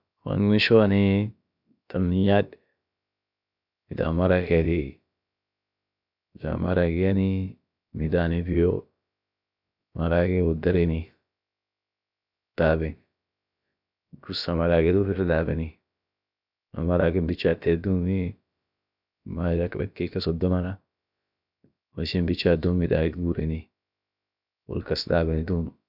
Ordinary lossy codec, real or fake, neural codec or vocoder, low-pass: none; fake; codec, 16 kHz, about 1 kbps, DyCAST, with the encoder's durations; 5.4 kHz